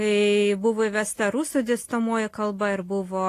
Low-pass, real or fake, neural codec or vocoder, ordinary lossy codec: 14.4 kHz; real; none; AAC, 48 kbps